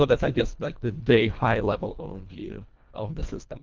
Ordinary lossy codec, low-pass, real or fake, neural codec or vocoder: Opus, 24 kbps; 7.2 kHz; fake; codec, 24 kHz, 1.5 kbps, HILCodec